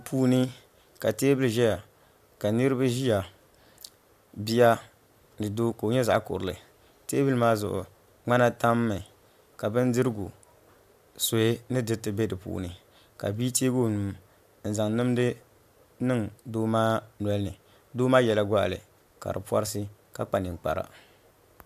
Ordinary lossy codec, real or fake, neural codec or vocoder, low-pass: AAC, 96 kbps; real; none; 14.4 kHz